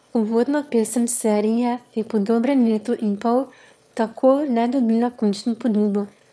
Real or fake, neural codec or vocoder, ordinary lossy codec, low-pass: fake; autoencoder, 22.05 kHz, a latent of 192 numbers a frame, VITS, trained on one speaker; none; none